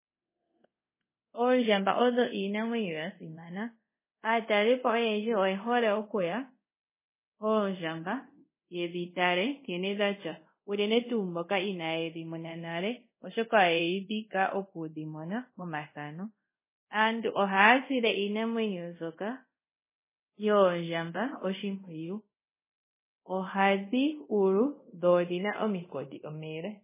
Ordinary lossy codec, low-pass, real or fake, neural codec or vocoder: MP3, 16 kbps; 3.6 kHz; fake; codec, 24 kHz, 0.5 kbps, DualCodec